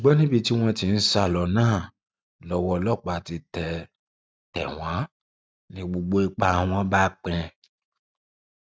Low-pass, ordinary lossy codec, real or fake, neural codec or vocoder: none; none; real; none